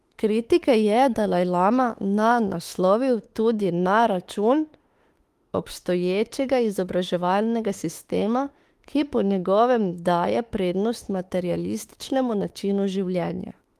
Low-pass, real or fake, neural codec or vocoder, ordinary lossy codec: 14.4 kHz; fake; autoencoder, 48 kHz, 32 numbers a frame, DAC-VAE, trained on Japanese speech; Opus, 24 kbps